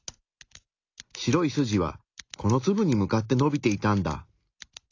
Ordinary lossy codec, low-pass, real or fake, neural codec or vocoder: AAC, 48 kbps; 7.2 kHz; real; none